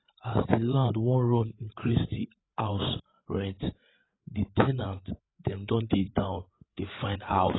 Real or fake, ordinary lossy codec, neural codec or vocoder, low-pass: fake; AAC, 16 kbps; codec, 16 kHz, 8 kbps, FreqCodec, larger model; 7.2 kHz